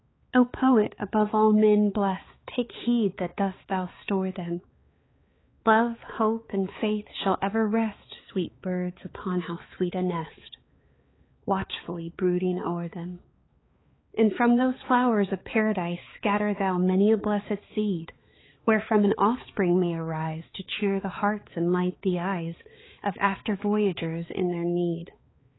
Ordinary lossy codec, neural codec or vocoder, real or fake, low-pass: AAC, 16 kbps; codec, 16 kHz, 4 kbps, X-Codec, HuBERT features, trained on balanced general audio; fake; 7.2 kHz